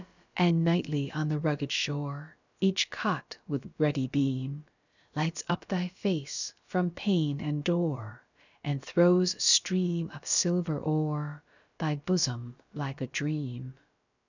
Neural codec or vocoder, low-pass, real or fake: codec, 16 kHz, about 1 kbps, DyCAST, with the encoder's durations; 7.2 kHz; fake